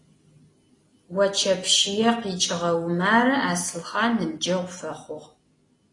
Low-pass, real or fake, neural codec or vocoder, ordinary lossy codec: 10.8 kHz; real; none; AAC, 32 kbps